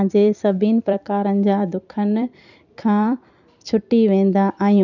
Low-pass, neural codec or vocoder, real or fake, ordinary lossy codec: 7.2 kHz; vocoder, 44.1 kHz, 128 mel bands every 256 samples, BigVGAN v2; fake; none